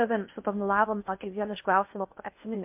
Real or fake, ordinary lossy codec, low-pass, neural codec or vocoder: fake; MP3, 32 kbps; 3.6 kHz; codec, 16 kHz in and 24 kHz out, 0.6 kbps, FocalCodec, streaming, 4096 codes